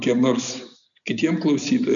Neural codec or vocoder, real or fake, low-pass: none; real; 7.2 kHz